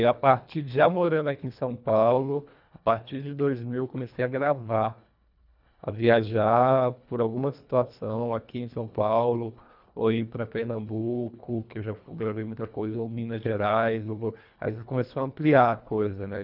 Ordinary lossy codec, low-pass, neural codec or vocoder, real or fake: none; 5.4 kHz; codec, 24 kHz, 1.5 kbps, HILCodec; fake